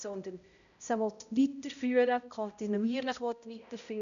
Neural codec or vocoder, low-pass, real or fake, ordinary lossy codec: codec, 16 kHz, 0.5 kbps, X-Codec, HuBERT features, trained on balanced general audio; 7.2 kHz; fake; none